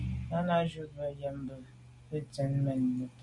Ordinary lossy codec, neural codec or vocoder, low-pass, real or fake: MP3, 48 kbps; none; 10.8 kHz; real